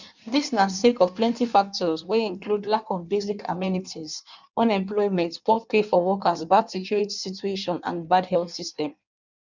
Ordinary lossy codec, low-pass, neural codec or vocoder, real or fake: none; 7.2 kHz; codec, 16 kHz in and 24 kHz out, 1.1 kbps, FireRedTTS-2 codec; fake